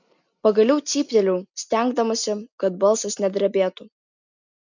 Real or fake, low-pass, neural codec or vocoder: real; 7.2 kHz; none